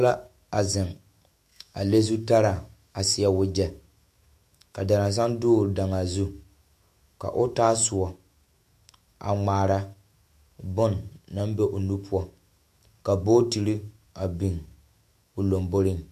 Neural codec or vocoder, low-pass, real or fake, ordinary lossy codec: autoencoder, 48 kHz, 128 numbers a frame, DAC-VAE, trained on Japanese speech; 14.4 kHz; fake; MP3, 64 kbps